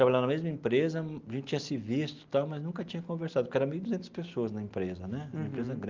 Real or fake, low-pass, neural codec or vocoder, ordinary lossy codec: real; 7.2 kHz; none; Opus, 32 kbps